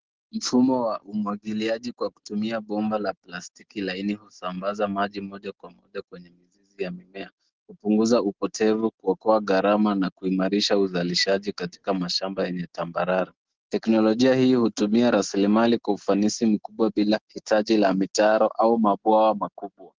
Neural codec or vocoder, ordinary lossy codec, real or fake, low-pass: none; Opus, 16 kbps; real; 7.2 kHz